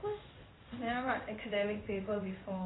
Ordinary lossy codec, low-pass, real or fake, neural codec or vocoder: AAC, 16 kbps; 7.2 kHz; fake; codec, 16 kHz in and 24 kHz out, 1 kbps, XY-Tokenizer